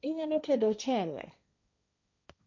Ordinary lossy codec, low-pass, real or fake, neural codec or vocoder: none; 7.2 kHz; fake; codec, 16 kHz, 1.1 kbps, Voila-Tokenizer